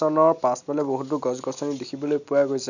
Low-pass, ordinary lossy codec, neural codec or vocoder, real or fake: 7.2 kHz; none; none; real